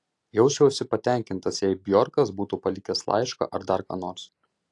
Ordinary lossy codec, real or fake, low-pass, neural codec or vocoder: AAC, 48 kbps; real; 10.8 kHz; none